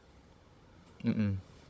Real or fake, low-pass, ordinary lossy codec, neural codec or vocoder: fake; none; none; codec, 16 kHz, 16 kbps, FunCodec, trained on Chinese and English, 50 frames a second